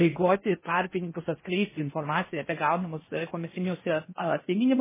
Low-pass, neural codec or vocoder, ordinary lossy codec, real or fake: 3.6 kHz; codec, 16 kHz in and 24 kHz out, 0.6 kbps, FocalCodec, streaming, 4096 codes; MP3, 16 kbps; fake